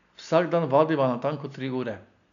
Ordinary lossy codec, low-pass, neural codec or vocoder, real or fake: none; 7.2 kHz; none; real